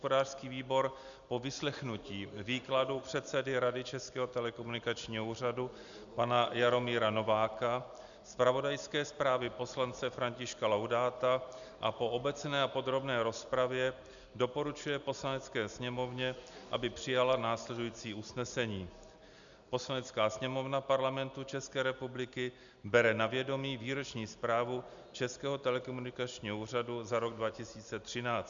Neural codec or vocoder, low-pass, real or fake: none; 7.2 kHz; real